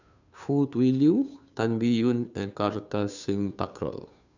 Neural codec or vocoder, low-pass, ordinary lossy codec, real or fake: codec, 16 kHz, 2 kbps, FunCodec, trained on Chinese and English, 25 frames a second; 7.2 kHz; none; fake